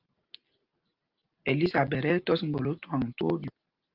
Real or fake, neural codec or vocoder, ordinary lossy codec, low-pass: real; none; Opus, 32 kbps; 5.4 kHz